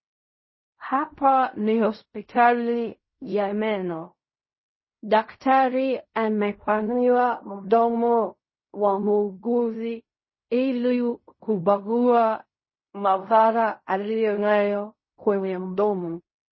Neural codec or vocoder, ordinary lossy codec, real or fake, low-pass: codec, 16 kHz in and 24 kHz out, 0.4 kbps, LongCat-Audio-Codec, fine tuned four codebook decoder; MP3, 24 kbps; fake; 7.2 kHz